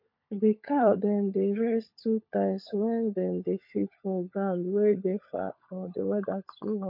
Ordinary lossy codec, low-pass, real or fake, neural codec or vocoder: MP3, 32 kbps; 5.4 kHz; fake; codec, 16 kHz, 16 kbps, FunCodec, trained on LibriTTS, 50 frames a second